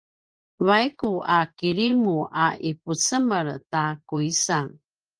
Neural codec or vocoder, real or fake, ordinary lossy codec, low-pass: vocoder, 24 kHz, 100 mel bands, Vocos; fake; Opus, 24 kbps; 9.9 kHz